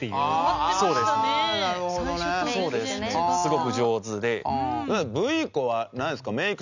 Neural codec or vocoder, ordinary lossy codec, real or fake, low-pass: none; none; real; 7.2 kHz